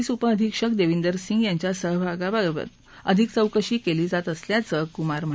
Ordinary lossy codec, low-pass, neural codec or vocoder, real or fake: none; none; none; real